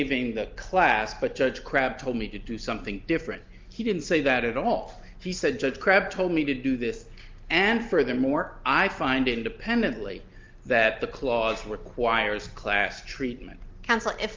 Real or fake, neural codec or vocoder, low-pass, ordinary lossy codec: real; none; 7.2 kHz; Opus, 24 kbps